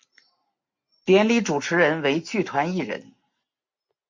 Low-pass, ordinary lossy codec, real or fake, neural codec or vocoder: 7.2 kHz; MP3, 48 kbps; real; none